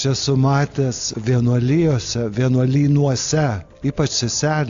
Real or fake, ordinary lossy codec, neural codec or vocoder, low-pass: real; AAC, 64 kbps; none; 7.2 kHz